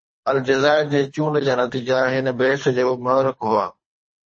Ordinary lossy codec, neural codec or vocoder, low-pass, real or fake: MP3, 32 kbps; codec, 24 kHz, 3 kbps, HILCodec; 9.9 kHz; fake